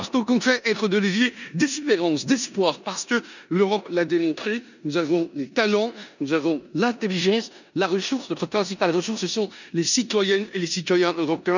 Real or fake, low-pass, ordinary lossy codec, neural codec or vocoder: fake; 7.2 kHz; none; codec, 16 kHz in and 24 kHz out, 0.9 kbps, LongCat-Audio-Codec, four codebook decoder